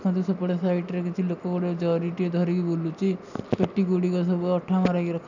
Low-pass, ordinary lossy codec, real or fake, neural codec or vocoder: 7.2 kHz; none; real; none